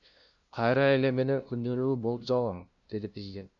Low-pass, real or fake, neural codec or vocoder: 7.2 kHz; fake; codec, 16 kHz, 0.5 kbps, FunCodec, trained on LibriTTS, 25 frames a second